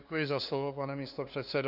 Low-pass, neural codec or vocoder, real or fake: 5.4 kHz; codec, 16 kHz, 2 kbps, FunCodec, trained on LibriTTS, 25 frames a second; fake